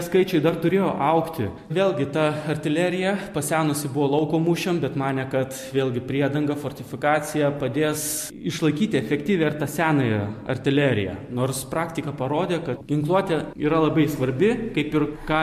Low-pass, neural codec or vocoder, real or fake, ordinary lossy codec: 14.4 kHz; none; real; MP3, 64 kbps